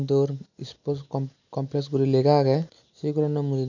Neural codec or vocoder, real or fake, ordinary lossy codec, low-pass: none; real; none; 7.2 kHz